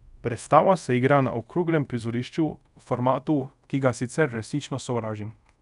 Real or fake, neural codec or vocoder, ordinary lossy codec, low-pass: fake; codec, 24 kHz, 0.5 kbps, DualCodec; none; 10.8 kHz